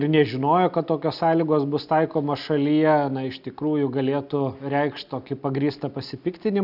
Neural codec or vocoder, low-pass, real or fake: none; 5.4 kHz; real